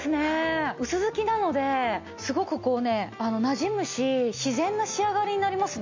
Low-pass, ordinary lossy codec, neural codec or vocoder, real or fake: 7.2 kHz; MP3, 64 kbps; none; real